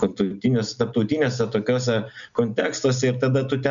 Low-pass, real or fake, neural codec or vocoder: 7.2 kHz; real; none